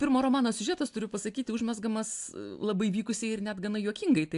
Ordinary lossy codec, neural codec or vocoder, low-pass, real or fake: AAC, 64 kbps; none; 10.8 kHz; real